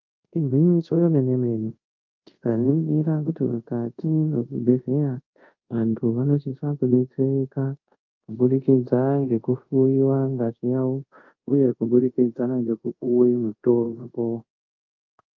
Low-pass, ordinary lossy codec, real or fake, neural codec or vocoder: 7.2 kHz; Opus, 24 kbps; fake; codec, 24 kHz, 0.5 kbps, DualCodec